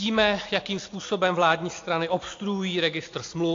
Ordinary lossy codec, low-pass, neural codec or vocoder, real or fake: AAC, 48 kbps; 7.2 kHz; none; real